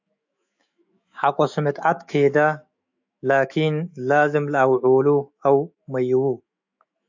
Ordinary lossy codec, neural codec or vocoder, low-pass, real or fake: AAC, 48 kbps; autoencoder, 48 kHz, 128 numbers a frame, DAC-VAE, trained on Japanese speech; 7.2 kHz; fake